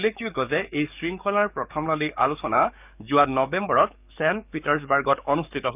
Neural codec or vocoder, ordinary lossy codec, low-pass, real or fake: codec, 44.1 kHz, 7.8 kbps, DAC; none; 3.6 kHz; fake